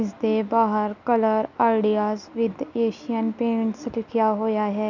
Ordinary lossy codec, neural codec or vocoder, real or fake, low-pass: none; none; real; 7.2 kHz